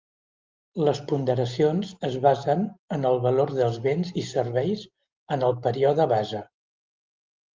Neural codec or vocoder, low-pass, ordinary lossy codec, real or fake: none; 7.2 kHz; Opus, 24 kbps; real